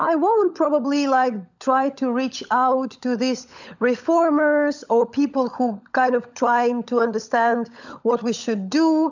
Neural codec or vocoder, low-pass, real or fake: codec, 16 kHz, 16 kbps, FunCodec, trained on LibriTTS, 50 frames a second; 7.2 kHz; fake